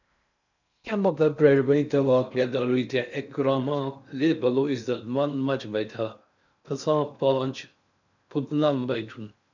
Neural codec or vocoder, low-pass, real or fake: codec, 16 kHz in and 24 kHz out, 0.6 kbps, FocalCodec, streaming, 4096 codes; 7.2 kHz; fake